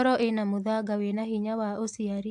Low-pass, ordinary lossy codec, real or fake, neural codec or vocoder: 10.8 kHz; AAC, 64 kbps; real; none